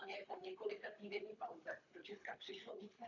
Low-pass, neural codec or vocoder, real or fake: 7.2 kHz; codec, 24 kHz, 3 kbps, HILCodec; fake